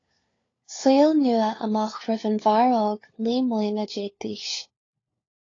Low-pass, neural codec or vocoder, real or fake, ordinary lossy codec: 7.2 kHz; codec, 16 kHz, 4 kbps, FunCodec, trained on LibriTTS, 50 frames a second; fake; AAC, 32 kbps